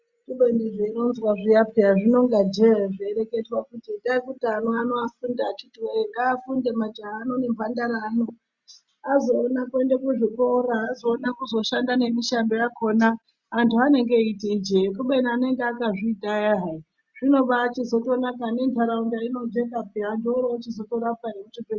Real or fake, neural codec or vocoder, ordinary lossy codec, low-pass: real; none; Opus, 64 kbps; 7.2 kHz